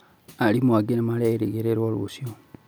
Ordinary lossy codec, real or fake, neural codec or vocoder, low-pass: none; real; none; none